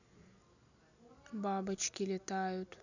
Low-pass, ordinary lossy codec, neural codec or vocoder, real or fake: 7.2 kHz; none; none; real